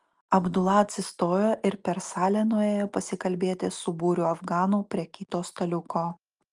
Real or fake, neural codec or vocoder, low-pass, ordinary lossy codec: real; none; 10.8 kHz; Opus, 32 kbps